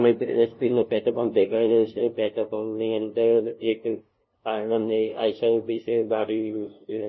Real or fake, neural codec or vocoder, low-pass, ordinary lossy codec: fake; codec, 16 kHz, 0.5 kbps, FunCodec, trained on LibriTTS, 25 frames a second; 7.2 kHz; MP3, 24 kbps